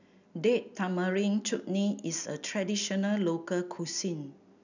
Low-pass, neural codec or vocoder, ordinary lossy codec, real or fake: 7.2 kHz; none; none; real